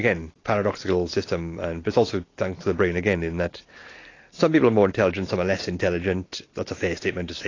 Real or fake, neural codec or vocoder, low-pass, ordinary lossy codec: real; none; 7.2 kHz; AAC, 32 kbps